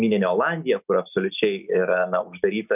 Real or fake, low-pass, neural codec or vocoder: real; 3.6 kHz; none